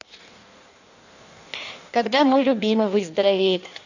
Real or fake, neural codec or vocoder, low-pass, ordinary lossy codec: fake; codec, 16 kHz in and 24 kHz out, 1.1 kbps, FireRedTTS-2 codec; 7.2 kHz; none